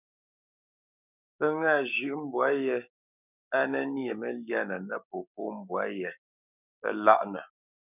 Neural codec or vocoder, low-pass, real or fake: codec, 16 kHz, 6 kbps, DAC; 3.6 kHz; fake